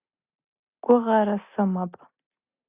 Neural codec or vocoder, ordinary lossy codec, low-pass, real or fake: none; Opus, 64 kbps; 3.6 kHz; real